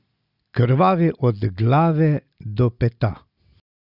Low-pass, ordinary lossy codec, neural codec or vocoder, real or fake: 5.4 kHz; Opus, 64 kbps; none; real